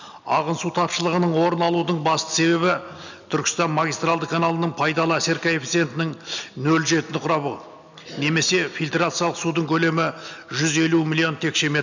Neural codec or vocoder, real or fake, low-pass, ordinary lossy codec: none; real; 7.2 kHz; none